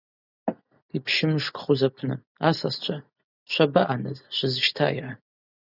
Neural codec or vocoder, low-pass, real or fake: none; 5.4 kHz; real